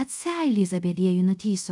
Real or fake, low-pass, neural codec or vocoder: fake; 10.8 kHz; codec, 24 kHz, 0.5 kbps, DualCodec